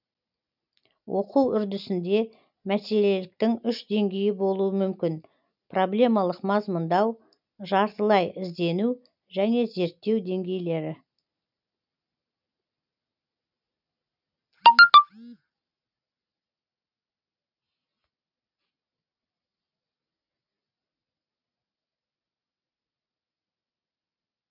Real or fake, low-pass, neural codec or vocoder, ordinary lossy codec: real; 5.4 kHz; none; none